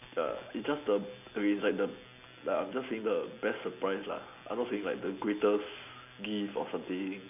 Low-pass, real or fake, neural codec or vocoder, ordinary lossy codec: 3.6 kHz; real; none; none